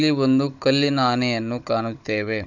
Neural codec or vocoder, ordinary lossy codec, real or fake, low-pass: codec, 16 kHz, 16 kbps, FunCodec, trained on Chinese and English, 50 frames a second; none; fake; 7.2 kHz